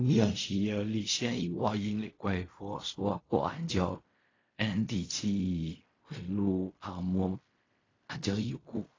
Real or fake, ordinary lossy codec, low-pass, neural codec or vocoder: fake; AAC, 32 kbps; 7.2 kHz; codec, 16 kHz in and 24 kHz out, 0.4 kbps, LongCat-Audio-Codec, fine tuned four codebook decoder